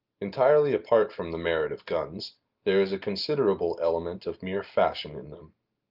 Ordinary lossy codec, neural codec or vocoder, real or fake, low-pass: Opus, 32 kbps; none; real; 5.4 kHz